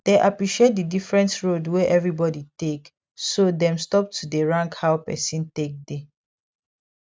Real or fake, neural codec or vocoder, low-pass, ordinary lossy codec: real; none; none; none